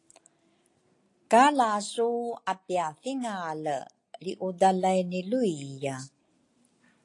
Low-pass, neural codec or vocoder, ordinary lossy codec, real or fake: 10.8 kHz; none; AAC, 48 kbps; real